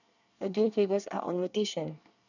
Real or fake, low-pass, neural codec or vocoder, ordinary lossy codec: fake; 7.2 kHz; codec, 24 kHz, 1 kbps, SNAC; none